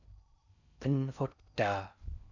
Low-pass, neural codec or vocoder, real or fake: 7.2 kHz; codec, 16 kHz in and 24 kHz out, 0.6 kbps, FocalCodec, streaming, 4096 codes; fake